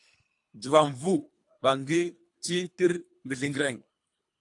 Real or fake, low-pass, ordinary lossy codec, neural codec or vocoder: fake; 10.8 kHz; AAC, 64 kbps; codec, 24 kHz, 3 kbps, HILCodec